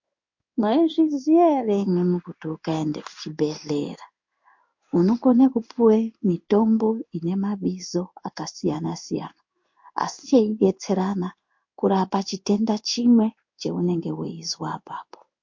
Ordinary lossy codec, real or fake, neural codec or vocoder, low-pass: MP3, 48 kbps; fake; codec, 16 kHz in and 24 kHz out, 1 kbps, XY-Tokenizer; 7.2 kHz